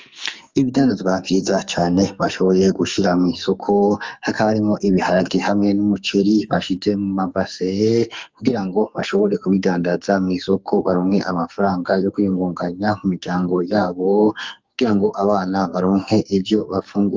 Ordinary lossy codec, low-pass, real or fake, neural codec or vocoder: Opus, 32 kbps; 7.2 kHz; fake; codec, 44.1 kHz, 2.6 kbps, SNAC